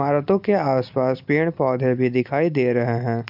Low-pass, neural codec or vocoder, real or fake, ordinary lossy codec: 5.4 kHz; none; real; MP3, 48 kbps